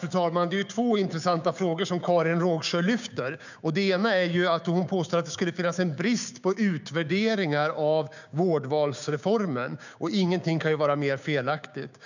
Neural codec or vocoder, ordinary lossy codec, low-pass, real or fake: codec, 16 kHz, 6 kbps, DAC; none; 7.2 kHz; fake